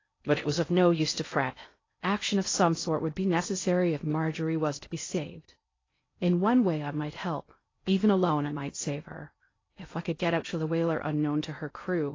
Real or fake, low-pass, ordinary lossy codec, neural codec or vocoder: fake; 7.2 kHz; AAC, 32 kbps; codec, 16 kHz in and 24 kHz out, 0.6 kbps, FocalCodec, streaming, 4096 codes